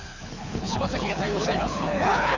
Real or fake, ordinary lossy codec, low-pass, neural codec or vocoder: fake; none; 7.2 kHz; codec, 24 kHz, 6 kbps, HILCodec